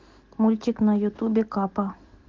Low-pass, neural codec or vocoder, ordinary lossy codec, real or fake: 7.2 kHz; none; Opus, 16 kbps; real